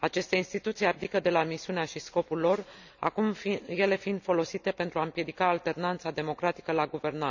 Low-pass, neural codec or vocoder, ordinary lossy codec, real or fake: 7.2 kHz; none; none; real